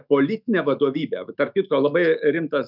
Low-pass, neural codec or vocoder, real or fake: 5.4 kHz; autoencoder, 48 kHz, 128 numbers a frame, DAC-VAE, trained on Japanese speech; fake